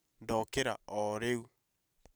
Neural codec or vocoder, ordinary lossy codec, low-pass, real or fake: vocoder, 44.1 kHz, 128 mel bands every 512 samples, BigVGAN v2; none; none; fake